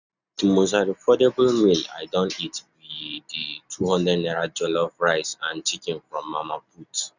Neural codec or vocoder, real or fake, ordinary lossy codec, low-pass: none; real; none; 7.2 kHz